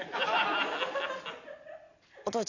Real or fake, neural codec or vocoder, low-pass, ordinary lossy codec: real; none; 7.2 kHz; none